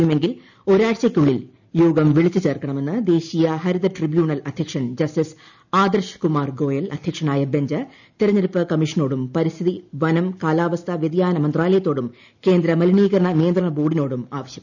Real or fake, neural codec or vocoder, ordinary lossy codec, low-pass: real; none; none; 7.2 kHz